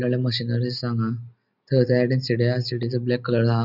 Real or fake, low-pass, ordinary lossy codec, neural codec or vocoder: real; 5.4 kHz; none; none